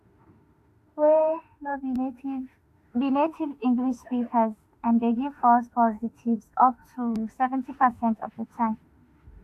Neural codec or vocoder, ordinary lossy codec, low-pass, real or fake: autoencoder, 48 kHz, 32 numbers a frame, DAC-VAE, trained on Japanese speech; AAC, 64 kbps; 14.4 kHz; fake